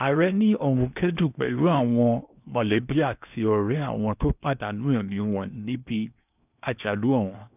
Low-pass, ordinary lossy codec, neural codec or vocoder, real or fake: 3.6 kHz; none; codec, 16 kHz in and 24 kHz out, 0.8 kbps, FocalCodec, streaming, 65536 codes; fake